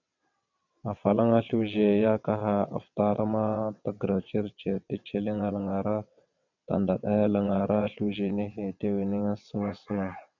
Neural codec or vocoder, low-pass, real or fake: vocoder, 22.05 kHz, 80 mel bands, WaveNeXt; 7.2 kHz; fake